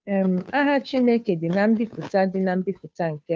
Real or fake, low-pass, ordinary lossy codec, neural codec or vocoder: fake; none; none; codec, 16 kHz, 2 kbps, FunCodec, trained on Chinese and English, 25 frames a second